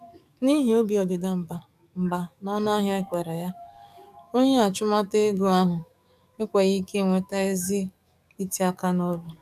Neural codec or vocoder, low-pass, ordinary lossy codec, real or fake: codec, 44.1 kHz, 7.8 kbps, DAC; 14.4 kHz; none; fake